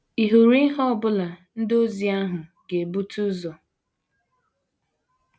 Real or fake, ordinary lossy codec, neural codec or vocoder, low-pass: real; none; none; none